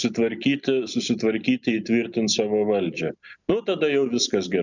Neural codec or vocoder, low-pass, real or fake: none; 7.2 kHz; real